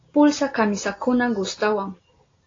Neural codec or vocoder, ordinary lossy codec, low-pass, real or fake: none; AAC, 32 kbps; 7.2 kHz; real